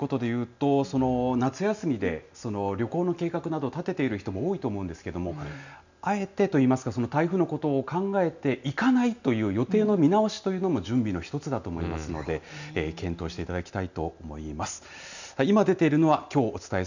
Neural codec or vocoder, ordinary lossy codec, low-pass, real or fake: none; none; 7.2 kHz; real